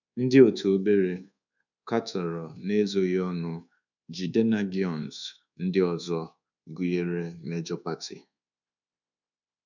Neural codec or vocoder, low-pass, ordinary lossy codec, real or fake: codec, 24 kHz, 1.2 kbps, DualCodec; 7.2 kHz; none; fake